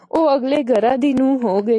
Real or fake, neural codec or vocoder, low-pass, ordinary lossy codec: real; none; 10.8 kHz; AAC, 48 kbps